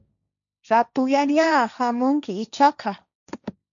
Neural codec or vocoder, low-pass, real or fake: codec, 16 kHz, 1.1 kbps, Voila-Tokenizer; 7.2 kHz; fake